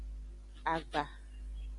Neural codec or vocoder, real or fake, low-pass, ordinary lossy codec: none; real; 10.8 kHz; Opus, 64 kbps